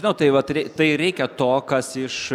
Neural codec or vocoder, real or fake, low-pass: none; real; 19.8 kHz